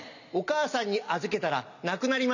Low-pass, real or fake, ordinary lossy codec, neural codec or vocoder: 7.2 kHz; real; none; none